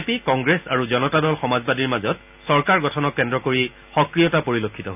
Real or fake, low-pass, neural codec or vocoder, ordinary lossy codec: real; 3.6 kHz; none; none